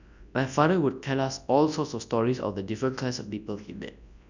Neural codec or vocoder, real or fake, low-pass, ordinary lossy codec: codec, 24 kHz, 0.9 kbps, WavTokenizer, large speech release; fake; 7.2 kHz; none